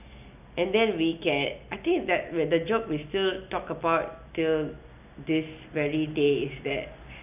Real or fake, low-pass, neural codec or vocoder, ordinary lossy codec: real; 3.6 kHz; none; none